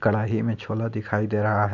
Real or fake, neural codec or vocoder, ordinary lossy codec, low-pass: real; none; none; 7.2 kHz